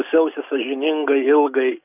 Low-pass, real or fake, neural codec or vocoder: 3.6 kHz; real; none